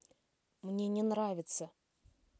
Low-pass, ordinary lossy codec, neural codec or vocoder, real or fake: none; none; none; real